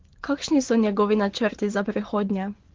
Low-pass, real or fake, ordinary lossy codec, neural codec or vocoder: 7.2 kHz; fake; Opus, 16 kbps; vocoder, 24 kHz, 100 mel bands, Vocos